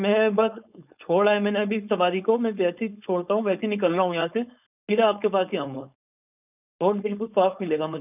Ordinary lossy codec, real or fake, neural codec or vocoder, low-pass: none; fake; codec, 16 kHz, 4.8 kbps, FACodec; 3.6 kHz